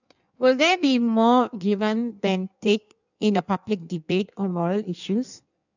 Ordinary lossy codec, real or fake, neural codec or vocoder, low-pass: none; fake; codec, 16 kHz in and 24 kHz out, 1.1 kbps, FireRedTTS-2 codec; 7.2 kHz